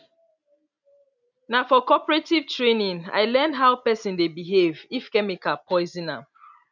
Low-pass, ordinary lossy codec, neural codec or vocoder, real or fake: 7.2 kHz; none; none; real